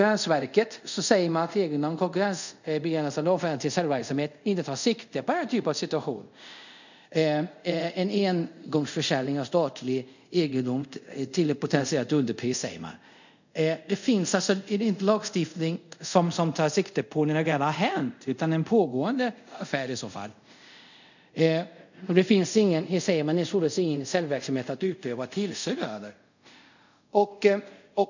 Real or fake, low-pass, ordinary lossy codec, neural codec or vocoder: fake; 7.2 kHz; none; codec, 24 kHz, 0.5 kbps, DualCodec